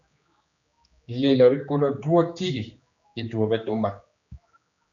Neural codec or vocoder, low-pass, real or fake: codec, 16 kHz, 2 kbps, X-Codec, HuBERT features, trained on general audio; 7.2 kHz; fake